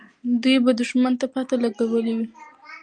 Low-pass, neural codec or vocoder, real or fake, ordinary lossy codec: 9.9 kHz; none; real; Opus, 32 kbps